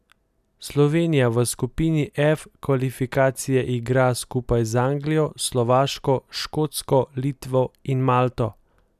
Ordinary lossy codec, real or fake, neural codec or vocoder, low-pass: none; real; none; 14.4 kHz